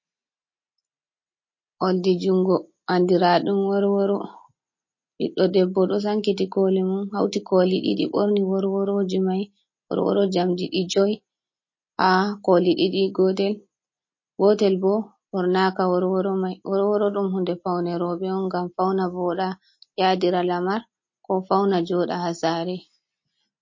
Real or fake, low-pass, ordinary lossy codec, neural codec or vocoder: real; 7.2 kHz; MP3, 32 kbps; none